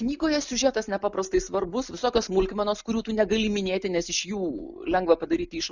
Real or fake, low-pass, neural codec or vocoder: real; 7.2 kHz; none